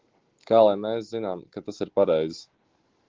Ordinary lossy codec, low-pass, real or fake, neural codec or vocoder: Opus, 16 kbps; 7.2 kHz; real; none